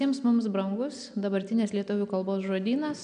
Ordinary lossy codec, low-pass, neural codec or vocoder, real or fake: MP3, 96 kbps; 9.9 kHz; none; real